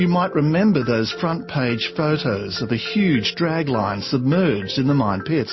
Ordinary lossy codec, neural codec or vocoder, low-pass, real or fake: MP3, 24 kbps; none; 7.2 kHz; real